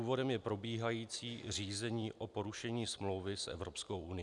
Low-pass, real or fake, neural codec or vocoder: 10.8 kHz; real; none